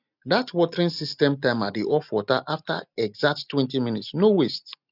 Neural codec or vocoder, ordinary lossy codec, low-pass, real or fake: none; none; 5.4 kHz; real